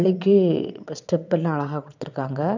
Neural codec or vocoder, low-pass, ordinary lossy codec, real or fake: vocoder, 22.05 kHz, 80 mel bands, WaveNeXt; 7.2 kHz; none; fake